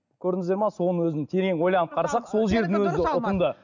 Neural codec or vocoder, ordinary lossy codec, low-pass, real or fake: none; none; 7.2 kHz; real